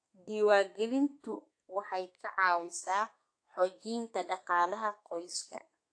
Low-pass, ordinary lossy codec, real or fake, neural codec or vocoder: 9.9 kHz; AAC, 48 kbps; fake; codec, 32 kHz, 1.9 kbps, SNAC